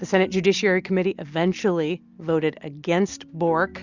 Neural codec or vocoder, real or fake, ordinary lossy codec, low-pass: none; real; Opus, 64 kbps; 7.2 kHz